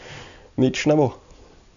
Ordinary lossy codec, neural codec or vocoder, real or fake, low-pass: none; none; real; 7.2 kHz